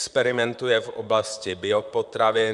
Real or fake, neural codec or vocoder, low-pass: fake; vocoder, 44.1 kHz, 128 mel bands, Pupu-Vocoder; 10.8 kHz